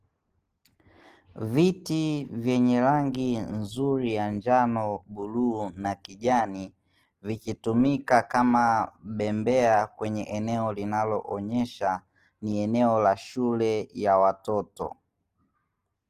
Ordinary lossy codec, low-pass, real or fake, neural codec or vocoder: Opus, 16 kbps; 14.4 kHz; real; none